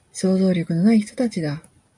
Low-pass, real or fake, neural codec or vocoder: 10.8 kHz; real; none